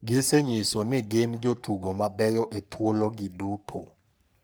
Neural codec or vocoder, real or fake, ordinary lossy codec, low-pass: codec, 44.1 kHz, 3.4 kbps, Pupu-Codec; fake; none; none